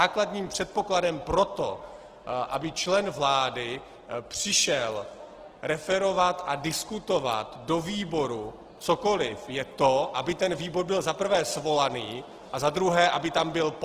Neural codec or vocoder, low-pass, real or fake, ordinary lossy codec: none; 14.4 kHz; real; Opus, 16 kbps